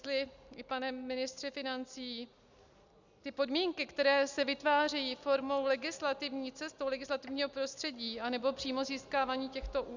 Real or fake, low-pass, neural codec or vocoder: real; 7.2 kHz; none